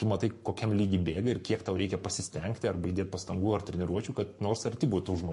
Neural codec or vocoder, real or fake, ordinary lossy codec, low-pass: codec, 44.1 kHz, 7.8 kbps, Pupu-Codec; fake; MP3, 48 kbps; 14.4 kHz